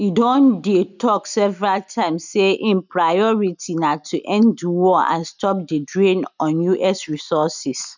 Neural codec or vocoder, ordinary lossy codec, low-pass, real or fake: none; none; 7.2 kHz; real